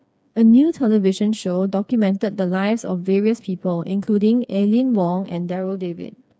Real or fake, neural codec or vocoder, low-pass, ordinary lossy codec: fake; codec, 16 kHz, 4 kbps, FreqCodec, smaller model; none; none